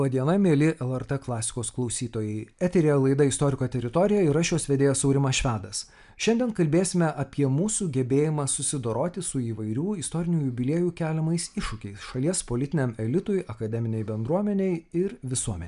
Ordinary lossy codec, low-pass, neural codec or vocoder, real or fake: MP3, 96 kbps; 10.8 kHz; none; real